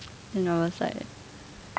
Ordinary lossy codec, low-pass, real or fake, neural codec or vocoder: none; none; real; none